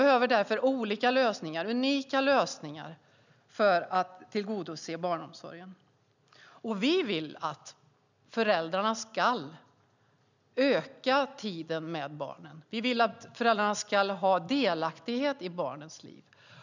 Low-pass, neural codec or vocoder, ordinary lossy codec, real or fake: 7.2 kHz; none; none; real